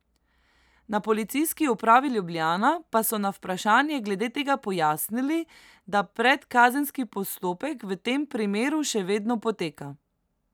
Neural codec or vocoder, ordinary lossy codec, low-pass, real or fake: none; none; none; real